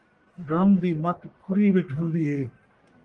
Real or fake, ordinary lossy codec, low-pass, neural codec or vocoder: fake; Opus, 32 kbps; 10.8 kHz; codec, 44.1 kHz, 1.7 kbps, Pupu-Codec